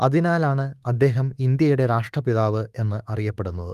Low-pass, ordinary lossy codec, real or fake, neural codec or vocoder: 14.4 kHz; Opus, 32 kbps; fake; autoencoder, 48 kHz, 32 numbers a frame, DAC-VAE, trained on Japanese speech